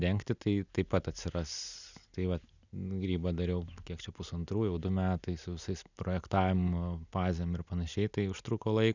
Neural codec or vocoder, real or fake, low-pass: none; real; 7.2 kHz